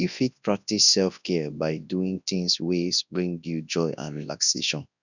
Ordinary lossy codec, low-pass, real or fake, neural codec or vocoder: none; 7.2 kHz; fake; codec, 24 kHz, 0.9 kbps, WavTokenizer, large speech release